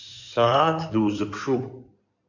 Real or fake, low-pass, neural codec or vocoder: fake; 7.2 kHz; codec, 16 kHz in and 24 kHz out, 2.2 kbps, FireRedTTS-2 codec